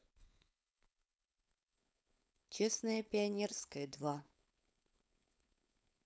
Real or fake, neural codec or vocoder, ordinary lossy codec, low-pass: fake; codec, 16 kHz, 4.8 kbps, FACodec; none; none